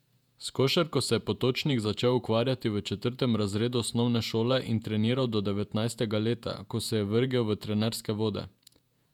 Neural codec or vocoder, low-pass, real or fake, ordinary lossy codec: vocoder, 48 kHz, 128 mel bands, Vocos; 19.8 kHz; fake; none